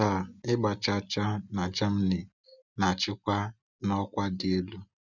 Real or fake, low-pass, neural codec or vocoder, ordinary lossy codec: real; 7.2 kHz; none; none